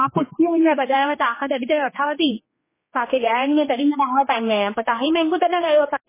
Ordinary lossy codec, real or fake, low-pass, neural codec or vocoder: MP3, 16 kbps; fake; 3.6 kHz; codec, 16 kHz, 2 kbps, X-Codec, HuBERT features, trained on general audio